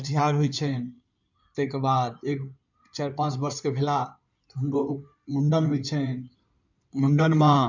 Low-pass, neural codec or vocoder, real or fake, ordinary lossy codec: 7.2 kHz; codec, 16 kHz in and 24 kHz out, 2.2 kbps, FireRedTTS-2 codec; fake; none